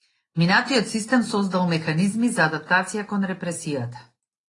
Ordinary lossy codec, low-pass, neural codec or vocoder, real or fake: AAC, 32 kbps; 10.8 kHz; none; real